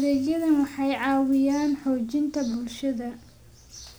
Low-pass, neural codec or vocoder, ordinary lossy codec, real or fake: none; none; none; real